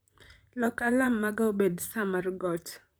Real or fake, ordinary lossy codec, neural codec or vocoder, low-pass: fake; none; vocoder, 44.1 kHz, 128 mel bands, Pupu-Vocoder; none